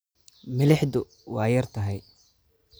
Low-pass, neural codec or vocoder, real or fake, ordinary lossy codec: none; none; real; none